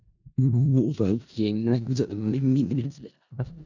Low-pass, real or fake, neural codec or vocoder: 7.2 kHz; fake; codec, 16 kHz in and 24 kHz out, 0.4 kbps, LongCat-Audio-Codec, four codebook decoder